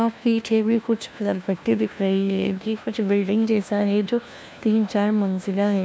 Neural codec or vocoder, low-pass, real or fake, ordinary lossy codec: codec, 16 kHz, 1 kbps, FunCodec, trained on LibriTTS, 50 frames a second; none; fake; none